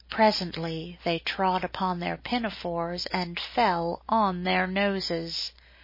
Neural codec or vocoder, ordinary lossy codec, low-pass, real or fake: none; MP3, 24 kbps; 5.4 kHz; real